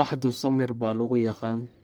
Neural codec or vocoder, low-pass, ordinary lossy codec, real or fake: codec, 44.1 kHz, 1.7 kbps, Pupu-Codec; none; none; fake